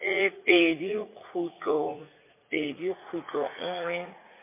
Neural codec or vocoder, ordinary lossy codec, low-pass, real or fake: vocoder, 44.1 kHz, 80 mel bands, Vocos; MP3, 24 kbps; 3.6 kHz; fake